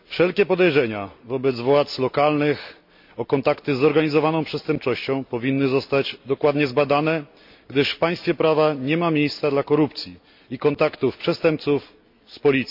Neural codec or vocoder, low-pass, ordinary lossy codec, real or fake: none; 5.4 kHz; MP3, 48 kbps; real